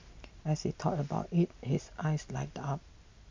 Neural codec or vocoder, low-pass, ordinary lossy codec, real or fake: none; 7.2 kHz; MP3, 64 kbps; real